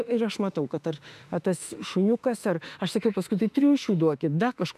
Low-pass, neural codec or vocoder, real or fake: 14.4 kHz; autoencoder, 48 kHz, 32 numbers a frame, DAC-VAE, trained on Japanese speech; fake